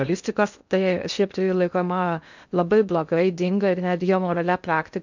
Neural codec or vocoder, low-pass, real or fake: codec, 16 kHz in and 24 kHz out, 0.6 kbps, FocalCodec, streaming, 2048 codes; 7.2 kHz; fake